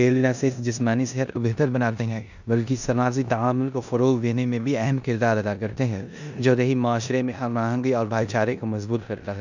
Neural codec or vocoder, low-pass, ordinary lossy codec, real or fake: codec, 16 kHz in and 24 kHz out, 0.9 kbps, LongCat-Audio-Codec, four codebook decoder; 7.2 kHz; none; fake